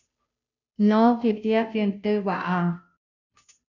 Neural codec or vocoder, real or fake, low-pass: codec, 16 kHz, 0.5 kbps, FunCodec, trained on Chinese and English, 25 frames a second; fake; 7.2 kHz